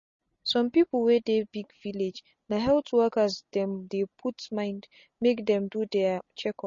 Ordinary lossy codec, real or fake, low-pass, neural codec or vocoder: MP3, 32 kbps; real; 7.2 kHz; none